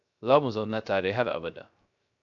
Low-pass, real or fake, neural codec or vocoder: 7.2 kHz; fake; codec, 16 kHz, 0.3 kbps, FocalCodec